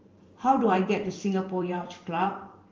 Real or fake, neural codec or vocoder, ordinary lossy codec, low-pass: fake; vocoder, 44.1 kHz, 128 mel bands every 512 samples, BigVGAN v2; Opus, 32 kbps; 7.2 kHz